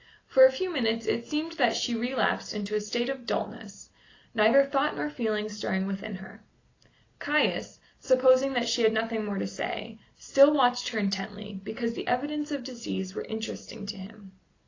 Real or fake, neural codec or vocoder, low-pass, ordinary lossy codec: real; none; 7.2 kHz; AAC, 32 kbps